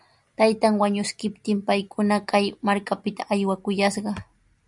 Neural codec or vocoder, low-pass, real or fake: none; 10.8 kHz; real